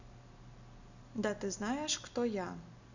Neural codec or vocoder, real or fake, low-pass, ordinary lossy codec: none; real; 7.2 kHz; none